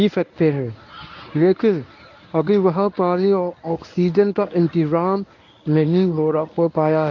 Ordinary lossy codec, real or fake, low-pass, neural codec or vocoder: none; fake; 7.2 kHz; codec, 24 kHz, 0.9 kbps, WavTokenizer, medium speech release version 1